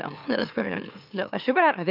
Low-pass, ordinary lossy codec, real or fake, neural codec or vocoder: 5.4 kHz; none; fake; autoencoder, 44.1 kHz, a latent of 192 numbers a frame, MeloTTS